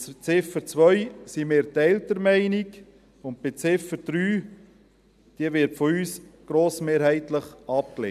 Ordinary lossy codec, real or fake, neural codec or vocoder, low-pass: none; real; none; 14.4 kHz